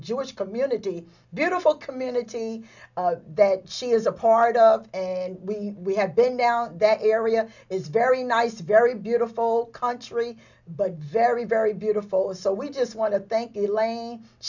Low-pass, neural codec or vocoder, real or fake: 7.2 kHz; none; real